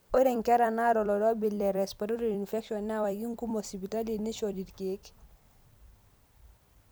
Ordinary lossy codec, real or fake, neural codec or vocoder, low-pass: none; fake; vocoder, 44.1 kHz, 128 mel bands every 256 samples, BigVGAN v2; none